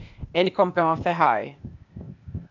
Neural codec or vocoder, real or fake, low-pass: codec, 16 kHz, 0.8 kbps, ZipCodec; fake; 7.2 kHz